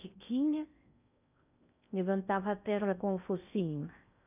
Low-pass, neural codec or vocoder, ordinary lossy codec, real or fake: 3.6 kHz; codec, 16 kHz in and 24 kHz out, 0.6 kbps, FocalCodec, streaming, 4096 codes; none; fake